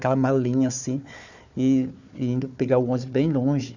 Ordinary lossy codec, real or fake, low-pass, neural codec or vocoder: none; fake; 7.2 kHz; codec, 16 kHz, 4 kbps, FunCodec, trained on Chinese and English, 50 frames a second